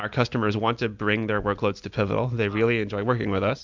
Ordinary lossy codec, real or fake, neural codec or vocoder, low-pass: MP3, 64 kbps; real; none; 7.2 kHz